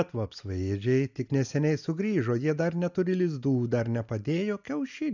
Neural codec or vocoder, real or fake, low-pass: none; real; 7.2 kHz